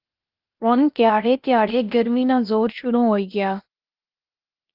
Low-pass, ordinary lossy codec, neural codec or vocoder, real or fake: 5.4 kHz; Opus, 32 kbps; codec, 16 kHz, 0.8 kbps, ZipCodec; fake